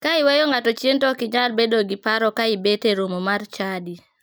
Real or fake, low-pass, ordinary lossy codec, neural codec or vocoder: real; none; none; none